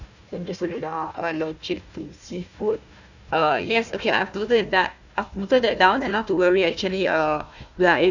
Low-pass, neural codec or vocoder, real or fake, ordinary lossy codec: 7.2 kHz; codec, 16 kHz, 1 kbps, FunCodec, trained on Chinese and English, 50 frames a second; fake; none